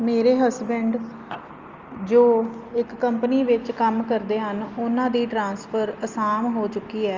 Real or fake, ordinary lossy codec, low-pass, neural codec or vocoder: real; Opus, 32 kbps; 7.2 kHz; none